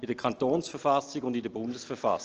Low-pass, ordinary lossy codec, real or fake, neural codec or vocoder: 7.2 kHz; Opus, 16 kbps; real; none